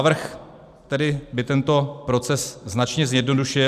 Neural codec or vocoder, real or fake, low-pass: none; real; 14.4 kHz